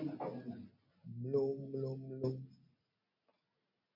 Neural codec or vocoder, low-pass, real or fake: none; 5.4 kHz; real